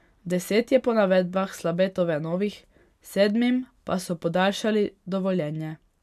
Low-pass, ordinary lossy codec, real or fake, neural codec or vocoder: 14.4 kHz; none; real; none